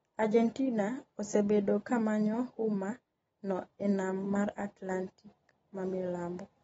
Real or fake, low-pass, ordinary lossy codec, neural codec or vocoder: real; 10.8 kHz; AAC, 24 kbps; none